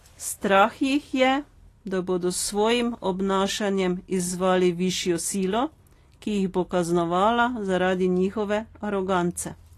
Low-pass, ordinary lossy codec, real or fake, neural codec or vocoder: 14.4 kHz; AAC, 48 kbps; real; none